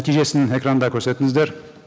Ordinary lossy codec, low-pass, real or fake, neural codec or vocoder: none; none; real; none